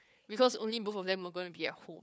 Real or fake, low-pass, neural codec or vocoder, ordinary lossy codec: fake; none; codec, 16 kHz, 4 kbps, FunCodec, trained on Chinese and English, 50 frames a second; none